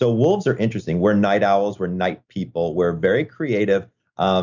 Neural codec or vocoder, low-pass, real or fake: none; 7.2 kHz; real